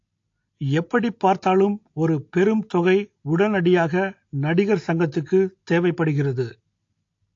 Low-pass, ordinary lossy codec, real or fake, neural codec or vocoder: 7.2 kHz; AAC, 48 kbps; real; none